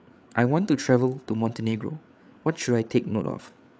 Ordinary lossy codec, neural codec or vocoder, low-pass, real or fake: none; codec, 16 kHz, 8 kbps, FreqCodec, larger model; none; fake